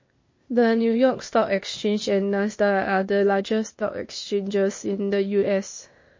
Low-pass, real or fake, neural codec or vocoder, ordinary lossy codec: 7.2 kHz; fake; codec, 16 kHz, 0.8 kbps, ZipCodec; MP3, 32 kbps